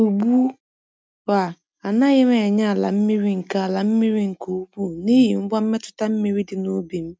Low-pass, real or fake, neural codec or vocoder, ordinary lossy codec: none; real; none; none